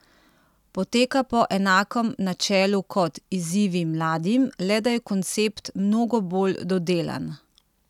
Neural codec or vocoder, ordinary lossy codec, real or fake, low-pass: none; none; real; 19.8 kHz